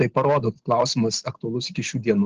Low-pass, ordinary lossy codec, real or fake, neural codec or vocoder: 7.2 kHz; Opus, 16 kbps; real; none